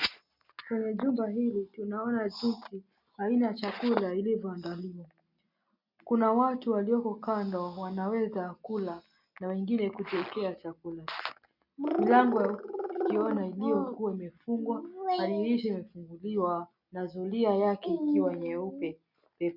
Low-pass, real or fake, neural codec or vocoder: 5.4 kHz; real; none